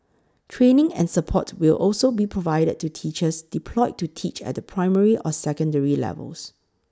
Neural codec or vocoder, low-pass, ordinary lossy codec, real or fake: none; none; none; real